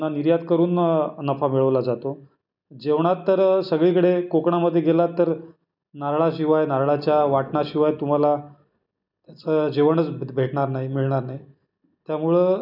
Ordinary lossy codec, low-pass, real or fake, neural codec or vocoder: none; 5.4 kHz; real; none